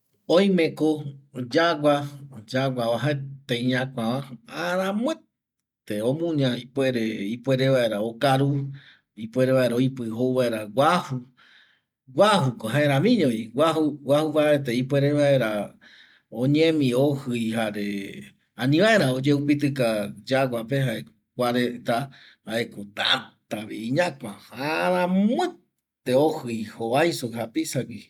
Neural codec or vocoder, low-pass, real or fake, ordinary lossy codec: none; 19.8 kHz; real; none